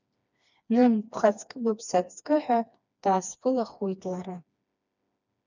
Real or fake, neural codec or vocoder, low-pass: fake; codec, 16 kHz, 2 kbps, FreqCodec, smaller model; 7.2 kHz